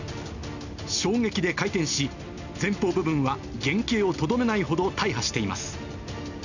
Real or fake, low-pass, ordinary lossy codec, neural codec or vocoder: real; 7.2 kHz; none; none